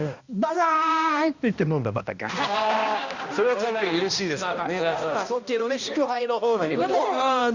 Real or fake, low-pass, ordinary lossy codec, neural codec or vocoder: fake; 7.2 kHz; Opus, 64 kbps; codec, 16 kHz, 1 kbps, X-Codec, HuBERT features, trained on balanced general audio